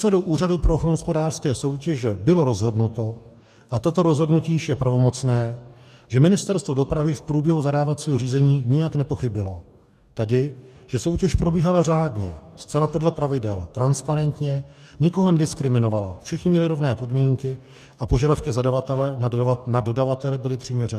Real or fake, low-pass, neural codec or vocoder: fake; 14.4 kHz; codec, 44.1 kHz, 2.6 kbps, DAC